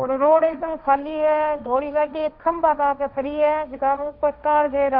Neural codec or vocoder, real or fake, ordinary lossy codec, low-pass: codec, 16 kHz, 1.1 kbps, Voila-Tokenizer; fake; none; 5.4 kHz